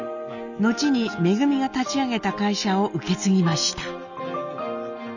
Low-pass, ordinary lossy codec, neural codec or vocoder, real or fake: 7.2 kHz; none; none; real